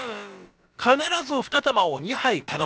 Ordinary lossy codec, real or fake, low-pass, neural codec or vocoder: none; fake; none; codec, 16 kHz, about 1 kbps, DyCAST, with the encoder's durations